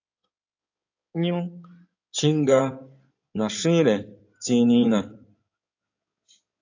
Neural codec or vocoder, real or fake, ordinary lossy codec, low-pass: codec, 16 kHz in and 24 kHz out, 2.2 kbps, FireRedTTS-2 codec; fake; AAC, 48 kbps; 7.2 kHz